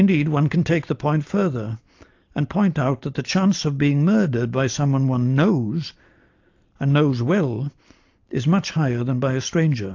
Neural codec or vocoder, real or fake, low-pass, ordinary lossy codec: none; real; 7.2 kHz; AAC, 48 kbps